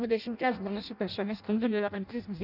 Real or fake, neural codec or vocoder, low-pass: fake; codec, 16 kHz in and 24 kHz out, 0.6 kbps, FireRedTTS-2 codec; 5.4 kHz